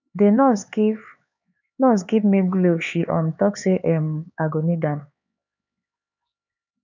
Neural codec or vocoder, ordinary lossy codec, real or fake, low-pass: codec, 16 kHz, 4 kbps, X-Codec, HuBERT features, trained on LibriSpeech; none; fake; 7.2 kHz